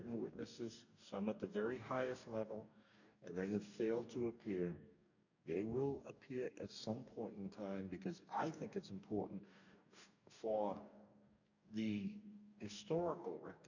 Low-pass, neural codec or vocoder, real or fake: 7.2 kHz; codec, 44.1 kHz, 2.6 kbps, DAC; fake